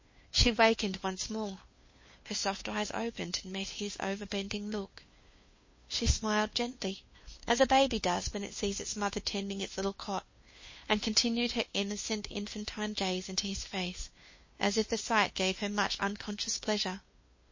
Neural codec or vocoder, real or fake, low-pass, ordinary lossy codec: codec, 16 kHz, 2 kbps, FunCodec, trained on Chinese and English, 25 frames a second; fake; 7.2 kHz; MP3, 32 kbps